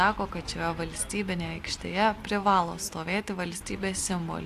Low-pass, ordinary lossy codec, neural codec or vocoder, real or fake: 14.4 kHz; AAC, 64 kbps; none; real